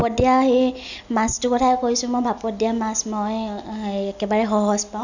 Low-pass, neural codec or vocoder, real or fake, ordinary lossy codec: 7.2 kHz; none; real; none